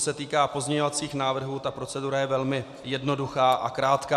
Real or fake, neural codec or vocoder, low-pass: real; none; 14.4 kHz